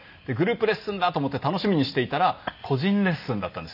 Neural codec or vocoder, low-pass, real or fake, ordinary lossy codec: none; 5.4 kHz; real; none